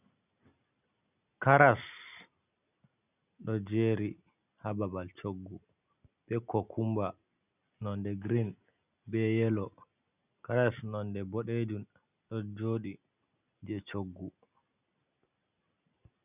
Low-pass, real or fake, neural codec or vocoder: 3.6 kHz; real; none